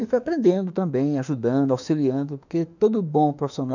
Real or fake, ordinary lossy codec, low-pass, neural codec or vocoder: fake; none; 7.2 kHz; autoencoder, 48 kHz, 32 numbers a frame, DAC-VAE, trained on Japanese speech